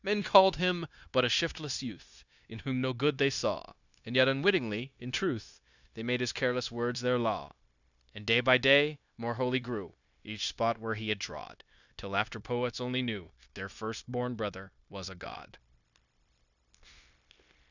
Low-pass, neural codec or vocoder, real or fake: 7.2 kHz; codec, 16 kHz, 0.9 kbps, LongCat-Audio-Codec; fake